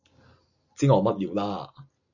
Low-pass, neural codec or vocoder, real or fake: 7.2 kHz; none; real